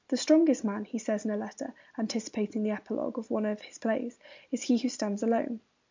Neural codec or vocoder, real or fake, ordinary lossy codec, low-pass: none; real; MP3, 64 kbps; 7.2 kHz